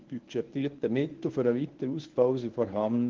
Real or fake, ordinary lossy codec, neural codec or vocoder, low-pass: fake; Opus, 16 kbps; codec, 24 kHz, 0.9 kbps, WavTokenizer, medium speech release version 1; 7.2 kHz